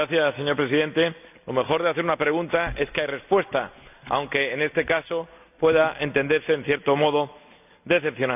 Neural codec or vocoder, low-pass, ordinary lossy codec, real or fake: none; 3.6 kHz; none; real